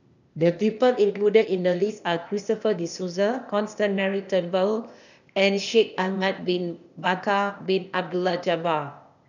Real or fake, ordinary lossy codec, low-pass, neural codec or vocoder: fake; none; 7.2 kHz; codec, 16 kHz, 0.8 kbps, ZipCodec